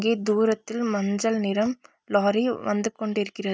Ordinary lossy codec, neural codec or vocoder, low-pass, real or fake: none; none; none; real